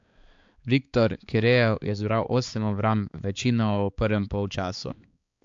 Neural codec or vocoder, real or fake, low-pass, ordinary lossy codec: codec, 16 kHz, 4 kbps, X-Codec, HuBERT features, trained on balanced general audio; fake; 7.2 kHz; AAC, 64 kbps